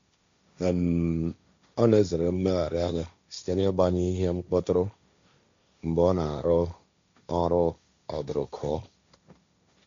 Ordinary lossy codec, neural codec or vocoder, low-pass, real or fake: MP3, 64 kbps; codec, 16 kHz, 1.1 kbps, Voila-Tokenizer; 7.2 kHz; fake